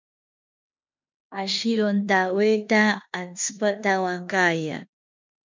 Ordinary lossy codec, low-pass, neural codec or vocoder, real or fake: MP3, 64 kbps; 7.2 kHz; codec, 16 kHz in and 24 kHz out, 0.9 kbps, LongCat-Audio-Codec, four codebook decoder; fake